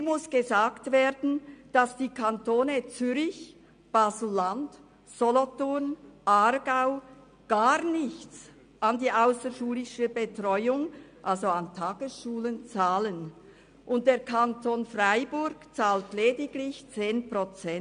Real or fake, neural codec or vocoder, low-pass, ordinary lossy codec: real; none; 9.9 kHz; MP3, 64 kbps